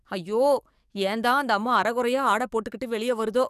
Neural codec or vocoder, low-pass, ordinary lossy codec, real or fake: codec, 44.1 kHz, 7.8 kbps, DAC; 14.4 kHz; none; fake